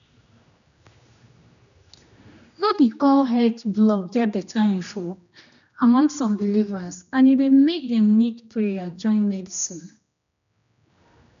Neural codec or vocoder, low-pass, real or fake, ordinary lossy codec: codec, 16 kHz, 1 kbps, X-Codec, HuBERT features, trained on general audio; 7.2 kHz; fake; none